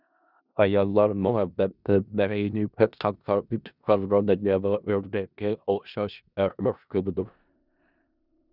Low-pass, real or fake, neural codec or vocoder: 5.4 kHz; fake; codec, 16 kHz in and 24 kHz out, 0.4 kbps, LongCat-Audio-Codec, four codebook decoder